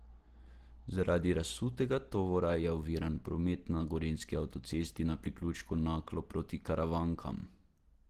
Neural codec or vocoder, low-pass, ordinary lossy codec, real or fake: none; 14.4 kHz; Opus, 16 kbps; real